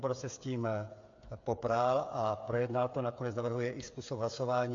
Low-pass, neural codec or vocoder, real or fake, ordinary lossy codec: 7.2 kHz; codec, 16 kHz, 8 kbps, FreqCodec, smaller model; fake; AAC, 48 kbps